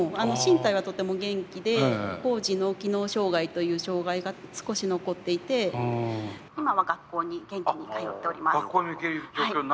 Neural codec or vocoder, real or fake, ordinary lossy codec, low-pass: none; real; none; none